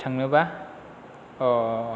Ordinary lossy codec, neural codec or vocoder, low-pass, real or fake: none; none; none; real